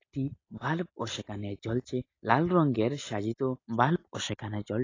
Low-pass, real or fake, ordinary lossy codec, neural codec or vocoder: 7.2 kHz; real; AAC, 32 kbps; none